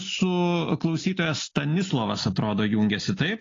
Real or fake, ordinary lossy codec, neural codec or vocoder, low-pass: real; AAC, 32 kbps; none; 7.2 kHz